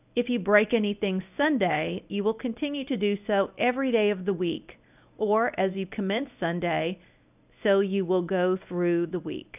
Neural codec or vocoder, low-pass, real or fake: codec, 24 kHz, 0.9 kbps, WavTokenizer, medium speech release version 1; 3.6 kHz; fake